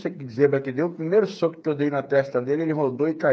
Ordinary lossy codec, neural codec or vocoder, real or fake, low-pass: none; codec, 16 kHz, 4 kbps, FreqCodec, smaller model; fake; none